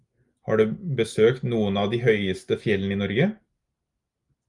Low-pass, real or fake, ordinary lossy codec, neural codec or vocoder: 10.8 kHz; real; Opus, 32 kbps; none